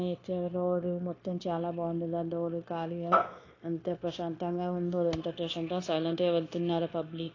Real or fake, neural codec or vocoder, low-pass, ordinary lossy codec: fake; codec, 16 kHz, 0.9 kbps, LongCat-Audio-Codec; 7.2 kHz; AAC, 32 kbps